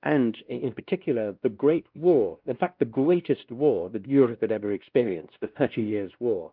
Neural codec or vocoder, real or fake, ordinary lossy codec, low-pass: codec, 16 kHz, 1 kbps, X-Codec, WavLM features, trained on Multilingual LibriSpeech; fake; Opus, 32 kbps; 5.4 kHz